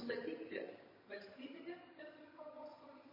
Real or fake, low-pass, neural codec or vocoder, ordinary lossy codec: fake; 5.4 kHz; vocoder, 22.05 kHz, 80 mel bands, HiFi-GAN; MP3, 24 kbps